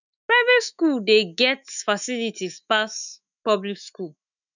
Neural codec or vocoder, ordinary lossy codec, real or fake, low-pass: autoencoder, 48 kHz, 128 numbers a frame, DAC-VAE, trained on Japanese speech; none; fake; 7.2 kHz